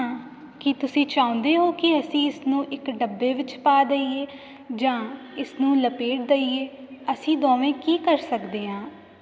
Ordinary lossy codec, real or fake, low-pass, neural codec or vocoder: none; real; none; none